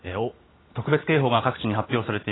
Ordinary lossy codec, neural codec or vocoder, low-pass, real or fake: AAC, 16 kbps; none; 7.2 kHz; real